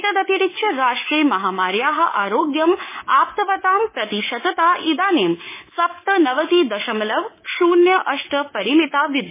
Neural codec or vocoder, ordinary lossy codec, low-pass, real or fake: codec, 24 kHz, 3.1 kbps, DualCodec; MP3, 16 kbps; 3.6 kHz; fake